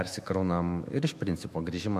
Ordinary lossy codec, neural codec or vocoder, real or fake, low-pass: MP3, 64 kbps; autoencoder, 48 kHz, 128 numbers a frame, DAC-VAE, trained on Japanese speech; fake; 14.4 kHz